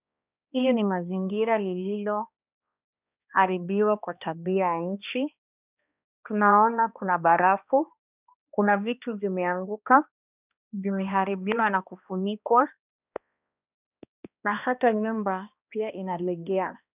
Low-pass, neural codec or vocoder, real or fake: 3.6 kHz; codec, 16 kHz, 1 kbps, X-Codec, HuBERT features, trained on balanced general audio; fake